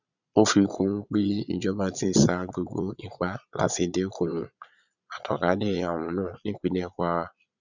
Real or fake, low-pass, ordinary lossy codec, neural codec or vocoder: fake; 7.2 kHz; none; vocoder, 22.05 kHz, 80 mel bands, Vocos